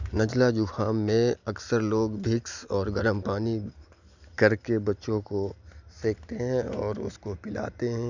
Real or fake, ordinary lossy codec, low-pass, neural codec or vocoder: real; none; 7.2 kHz; none